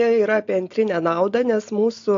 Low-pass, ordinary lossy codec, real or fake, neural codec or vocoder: 7.2 kHz; AAC, 48 kbps; real; none